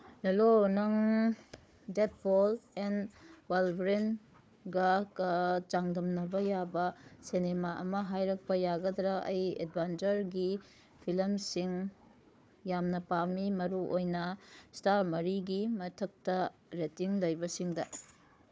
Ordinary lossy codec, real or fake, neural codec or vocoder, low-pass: none; fake; codec, 16 kHz, 4 kbps, FunCodec, trained on Chinese and English, 50 frames a second; none